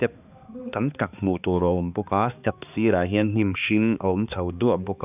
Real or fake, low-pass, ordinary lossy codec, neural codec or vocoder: fake; 3.6 kHz; none; codec, 16 kHz, 2 kbps, X-Codec, HuBERT features, trained on balanced general audio